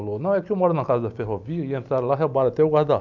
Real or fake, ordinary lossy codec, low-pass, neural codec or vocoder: real; none; 7.2 kHz; none